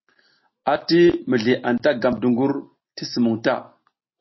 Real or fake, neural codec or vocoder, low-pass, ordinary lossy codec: real; none; 7.2 kHz; MP3, 24 kbps